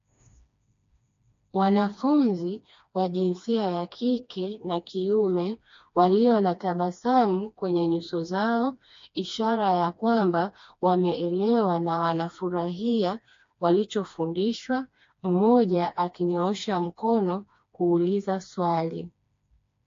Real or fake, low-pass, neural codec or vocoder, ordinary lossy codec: fake; 7.2 kHz; codec, 16 kHz, 2 kbps, FreqCodec, smaller model; AAC, 48 kbps